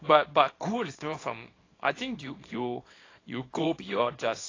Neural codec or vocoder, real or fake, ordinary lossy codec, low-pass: codec, 24 kHz, 0.9 kbps, WavTokenizer, small release; fake; AAC, 32 kbps; 7.2 kHz